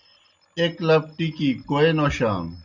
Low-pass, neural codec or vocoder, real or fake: 7.2 kHz; none; real